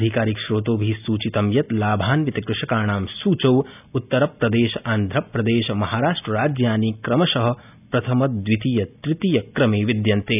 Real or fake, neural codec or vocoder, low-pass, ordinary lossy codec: real; none; 3.6 kHz; none